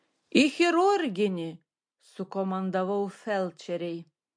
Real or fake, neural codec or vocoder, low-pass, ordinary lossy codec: real; none; 9.9 kHz; MP3, 48 kbps